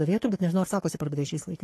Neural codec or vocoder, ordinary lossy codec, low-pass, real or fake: codec, 44.1 kHz, 3.4 kbps, Pupu-Codec; AAC, 48 kbps; 14.4 kHz; fake